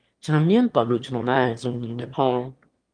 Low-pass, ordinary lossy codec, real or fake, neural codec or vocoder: 9.9 kHz; Opus, 32 kbps; fake; autoencoder, 22.05 kHz, a latent of 192 numbers a frame, VITS, trained on one speaker